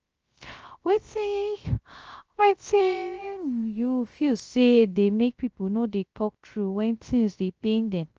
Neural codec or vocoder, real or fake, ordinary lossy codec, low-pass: codec, 16 kHz, 0.3 kbps, FocalCodec; fake; Opus, 24 kbps; 7.2 kHz